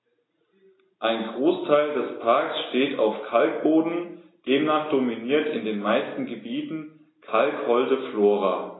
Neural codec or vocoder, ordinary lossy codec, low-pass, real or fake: none; AAC, 16 kbps; 7.2 kHz; real